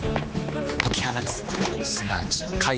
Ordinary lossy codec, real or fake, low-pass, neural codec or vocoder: none; fake; none; codec, 16 kHz, 2 kbps, X-Codec, HuBERT features, trained on general audio